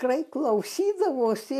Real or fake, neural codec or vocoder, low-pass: real; none; 14.4 kHz